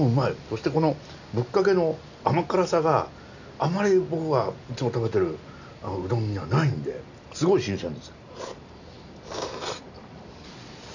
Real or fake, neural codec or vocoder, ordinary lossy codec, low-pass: real; none; none; 7.2 kHz